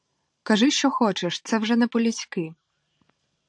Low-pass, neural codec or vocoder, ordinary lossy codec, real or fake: 9.9 kHz; vocoder, 44.1 kHz, 128 mel bands every 512 samples, BigVGAN v2; AAC, 64 kbps; fake